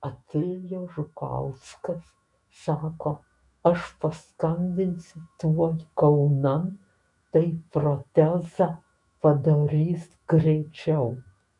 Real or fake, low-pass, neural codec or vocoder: fake; 10.8 kHz; autoencoder, 48 kHz, 128 numbers a frame, DAC-VAE, trained on Japanese speech